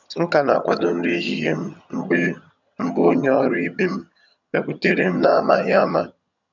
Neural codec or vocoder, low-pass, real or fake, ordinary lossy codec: vocoder, 22.05 kHz, 80 mel bands, HiFi-GAN; 7.2 kHz; fake; none